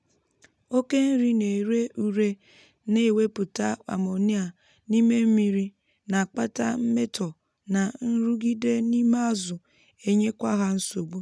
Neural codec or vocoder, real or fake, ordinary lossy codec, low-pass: none; real; none; none